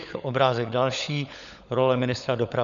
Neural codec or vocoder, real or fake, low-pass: codec, 16 kHz, 16 kbps, FunCodec, trained on LibriTTS, 50 frames a second; fake; 7.2 kHz